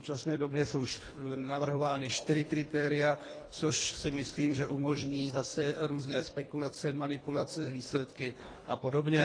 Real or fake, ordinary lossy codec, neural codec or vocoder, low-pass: fake; AAC, 32 kbps; codec, 24 kHz, 1.5 kbps, HILCodec; 9.9 kHz